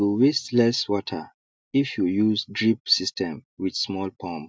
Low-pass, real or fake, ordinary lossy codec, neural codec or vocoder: none; real; none; none